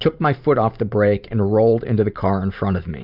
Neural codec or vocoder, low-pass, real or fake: vocoder, 44.1 kHz, 128 mel bands every 512 samples, BigVGAN v2; 5.4 kHz; fake